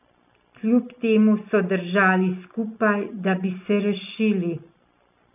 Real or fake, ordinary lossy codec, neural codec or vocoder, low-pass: real; none; none; 3.6 kHz